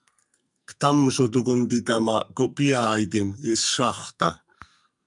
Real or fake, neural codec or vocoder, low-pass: fake; codec, 44.1 kHz, 2.6 kbps, SNAC; 10.8 kHz